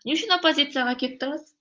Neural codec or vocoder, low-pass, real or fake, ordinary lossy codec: none; 7.2 kHz; real; Opus, 24 kbps